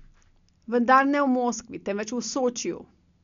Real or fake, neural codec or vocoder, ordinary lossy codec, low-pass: real; none; none; 7.2 kHz